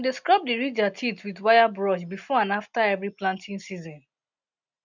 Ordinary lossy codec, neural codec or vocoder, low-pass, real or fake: none; none; 7.2 kHz; real